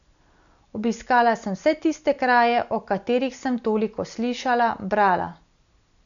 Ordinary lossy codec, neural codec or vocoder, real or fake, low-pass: MP3, 96 kbps; none; real; 7.2 kHz